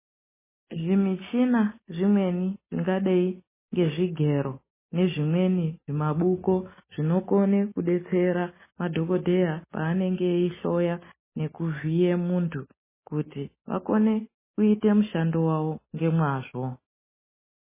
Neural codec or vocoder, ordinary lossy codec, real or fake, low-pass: none; MP3, 16 kbps; real; 3.6 kHz